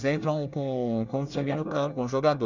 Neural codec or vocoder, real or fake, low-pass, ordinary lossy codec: codec, 24 kHz, 1 kbps, SNAC; fake; 7.2 kHz; none